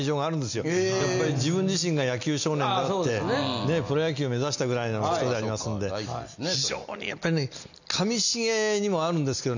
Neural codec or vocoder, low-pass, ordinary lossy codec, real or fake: none; 7.2 kHz; none; real